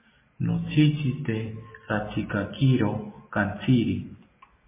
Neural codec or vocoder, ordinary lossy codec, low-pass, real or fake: none; MP3, 16 kbps; 3.6 kHz; real